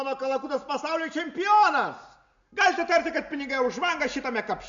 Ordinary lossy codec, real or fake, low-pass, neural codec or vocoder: MP3, 64 kbps; real; 7.2 kHz; none